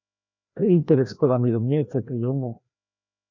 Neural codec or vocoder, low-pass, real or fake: codec, 16 kHz, 1 kbps, FreqCodec, larger model; 7.2 kHz; fake